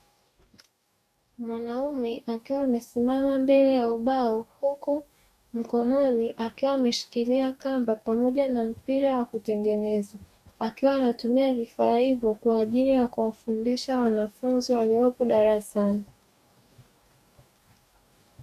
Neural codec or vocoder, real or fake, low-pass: codec, 44.1 kHz, 2.6 kbps, DAC; fake; 14.4 kHz